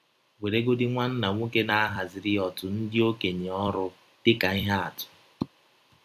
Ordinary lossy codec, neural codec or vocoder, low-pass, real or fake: MP3, 96 kbps; vocoder, 44.1 kHz, 128 mel bands every 256 samples, BigVGAN v2; 14.4 kHz; fake